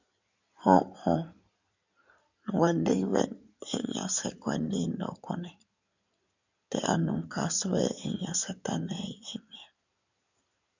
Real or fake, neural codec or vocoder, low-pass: fake; codec, 16 kHz in and 24 kHz out, 2.2 kbps, FireRedTTS-2 codec; 7.2 kHz